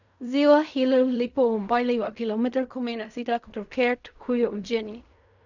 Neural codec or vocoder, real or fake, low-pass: codec, 16 kHz in and 24 kHz out, 0.4 kbps, LongCat-Audio-Codec, fine tuned four codebook decoder; fake; 7.2 kHz